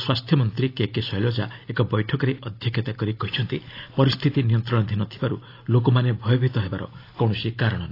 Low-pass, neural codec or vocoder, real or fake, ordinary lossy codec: 5.4 kHz; none; real; AAC, 32 kbps